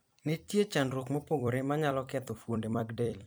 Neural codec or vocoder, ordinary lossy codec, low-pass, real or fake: vocoder, 44.1 kHz, 128 mel bands every 256 samples, BigVGAN v2; none; none; fake